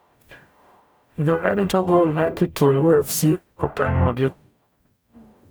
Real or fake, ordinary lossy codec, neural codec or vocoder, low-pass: fake; none; codec, 44.1 kHz, 0.9 kbps, DAC; none